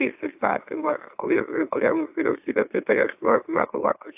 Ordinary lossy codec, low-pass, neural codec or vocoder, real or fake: AAC, 32 kbps; 3.6 kHz; autoencoder, 44.1 kHz, a latent of 192 numbers a frame, MeloTTS; fake